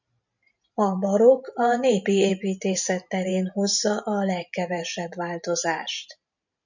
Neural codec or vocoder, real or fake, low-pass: vocoder, 44.1 kHz, 128 mel bands every 512 samples, BigVGAN v2; fake; 7.2 kHz